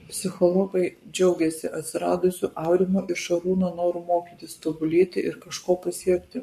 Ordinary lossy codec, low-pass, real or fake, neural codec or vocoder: MP3, 64 kbps; 14.4 kHz; fake; codec, 44.1 kHz, 7.8 kbps, Pupu-Codec